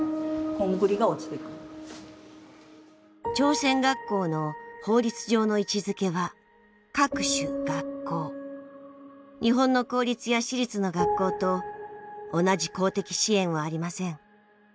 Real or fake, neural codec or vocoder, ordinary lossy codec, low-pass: real; none; none; none